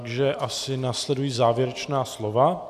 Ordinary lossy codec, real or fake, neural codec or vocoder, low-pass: AAC, 96 kbps; real; none; 14.4 kHz